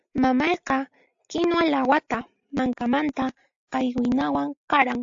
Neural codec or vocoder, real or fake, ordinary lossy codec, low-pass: none; real; AAC, 64 kbps; 7.2 kHz